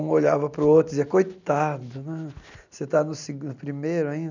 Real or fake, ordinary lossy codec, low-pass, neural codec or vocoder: real; none; 7.2 kHz; none